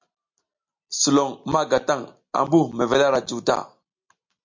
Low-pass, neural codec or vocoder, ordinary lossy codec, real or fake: 7.2 kHz; none; MP3, 48 kbps; real